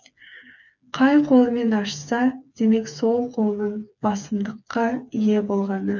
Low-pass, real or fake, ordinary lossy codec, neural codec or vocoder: 7.2 kHz; fake; none; codec, 16 kHz, 4 kbps, FreqCodec, smaller model